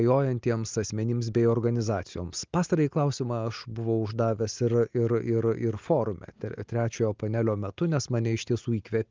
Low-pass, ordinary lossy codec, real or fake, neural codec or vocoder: 7.2 kHz; Opus, 32 kbps; real; none